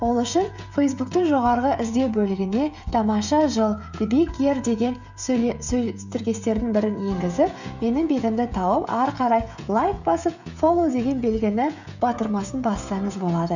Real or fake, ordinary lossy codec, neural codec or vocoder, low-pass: fake; none; codec, 16 kHz, 16 kbps, FreqCodec, smaller model; 7.2 kHz